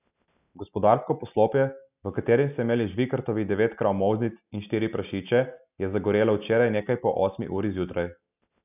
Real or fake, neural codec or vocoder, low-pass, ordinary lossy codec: real; none; 3.6 kHz; none